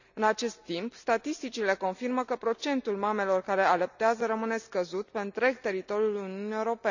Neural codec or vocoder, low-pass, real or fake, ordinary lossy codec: none; 7.2 kHz; real; none